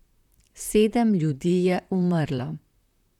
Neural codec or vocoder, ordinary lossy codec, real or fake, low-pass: vocoder, 44.1 kHz, 128 mel bands, Pupu-Vocoder; none; fake; 19.8 kHz